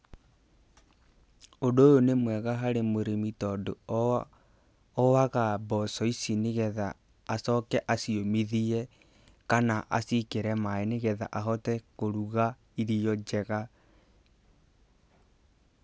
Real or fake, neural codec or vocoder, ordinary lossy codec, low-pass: real; none; none; none